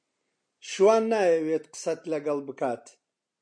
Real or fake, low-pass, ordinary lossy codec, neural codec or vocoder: real; 9.9 kHz; MP3, 64 kbps; none